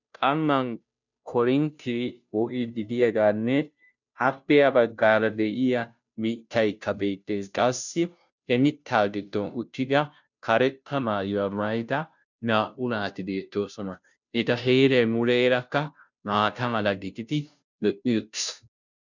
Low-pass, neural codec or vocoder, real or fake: 7.2 kHz; codec, 16 kHz, 0.5 kbps, FunCodec, trained on Chinese and English, 25 frames a second; fake